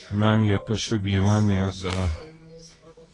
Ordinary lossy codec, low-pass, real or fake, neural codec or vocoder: AAC, 32 kbps; 10.8 kHz; fake; codec, 24 kHz, 0.9 kbps, WavTokenizer, medium music audio release